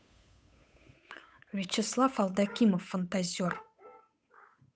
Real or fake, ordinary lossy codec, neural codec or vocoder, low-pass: fake; none; codec, 16 kHz, 8 kbps, FunCodec, trained on Chinese and English, 25 frames a second; none